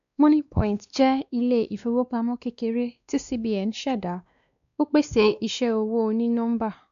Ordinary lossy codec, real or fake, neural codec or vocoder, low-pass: none; fake; codec, 16 kHz, 2 kbps, X-Codec, WavLM features, trained on Multilingual LibriSpeech; 7.2 kHz